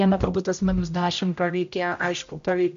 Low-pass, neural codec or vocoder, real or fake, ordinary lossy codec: 7.2 kHz; codec, 16 kHz, 0.5 kbps, X-Codec, HuBERT features, trained on general audio; fake; MP3, 64 kbps